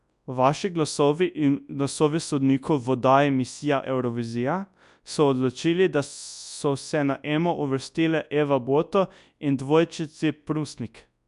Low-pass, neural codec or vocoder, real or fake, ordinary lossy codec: 10.8 kHz; codec, 24 kHz, 0.9 kbps, WavTokenizer, large speech release; fake; none